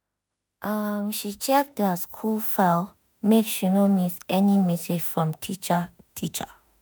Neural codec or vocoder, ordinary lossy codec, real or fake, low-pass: autoencoder, 48 kHz, 32 numbers a frame, DAC-VAE, trained on Japanese speech; none; fake; none